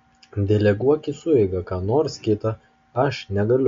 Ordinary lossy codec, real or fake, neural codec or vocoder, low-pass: MP3, 48 kbps; real; none; 7.2 kHz